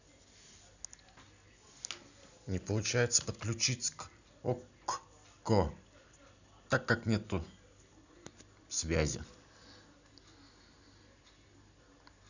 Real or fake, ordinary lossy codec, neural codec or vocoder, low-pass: real; none; none; 7.2 kHz